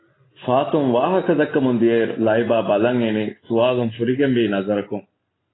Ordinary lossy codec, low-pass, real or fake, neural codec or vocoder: AAC, 16 kbps; 7.2 kHz; real; none